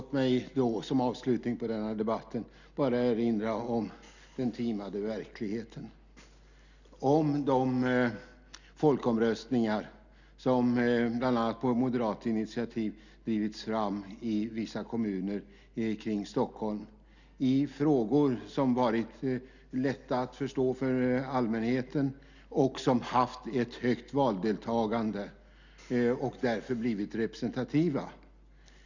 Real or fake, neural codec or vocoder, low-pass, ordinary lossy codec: real; none; 7.2 kHz; none